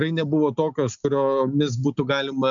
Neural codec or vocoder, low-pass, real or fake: none; 7.2 kHz; real